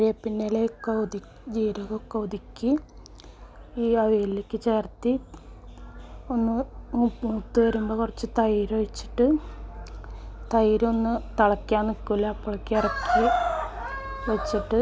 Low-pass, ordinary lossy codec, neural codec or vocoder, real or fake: none; none; none; real